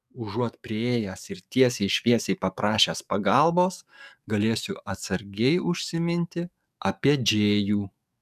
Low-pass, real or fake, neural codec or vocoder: 14.4 kHz; fake; codec, 44.1 kHz, 7.8 kbps, DAC